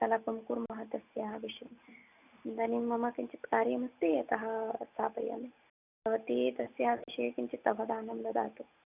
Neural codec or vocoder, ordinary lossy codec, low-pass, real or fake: none; none; 3.6 kHz; real